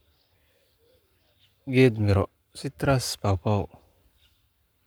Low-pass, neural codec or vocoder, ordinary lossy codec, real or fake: none; codec, 44.1 kHz, 7.8 kbps, Pupu-Codec; none; fake